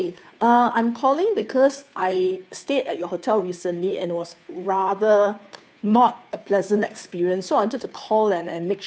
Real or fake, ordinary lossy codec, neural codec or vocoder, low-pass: fake; none; codec, 16 kHz, 2 kbps, FunCodec, trained on Chinese and English, 25 frames a second; none